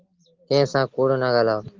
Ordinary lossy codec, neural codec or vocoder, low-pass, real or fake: Opus, 16 kbps; none; 7.2 kHz; real